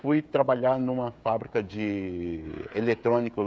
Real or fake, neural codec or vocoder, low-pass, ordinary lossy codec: fake; codec, 16 kHz, 16 kbps, FreqCodec, smaller model; none; none